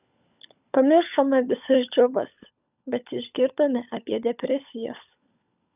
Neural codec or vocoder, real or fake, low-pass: codec, 16 kHz, 16 kbps, FunCodec, trained on LibriTTS, 50 frames a second; fake; 3.6 kHz